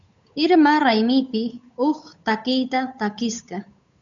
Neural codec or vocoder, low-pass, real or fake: codec, 16 kHz, 8 kbps, FunCodec, trained on Chinese and English, 25 frames a second; 7.2 kHz; fake